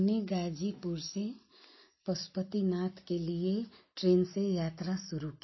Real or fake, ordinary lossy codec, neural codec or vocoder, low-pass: real; MP3, 24 kbps; none; 7.2 kHz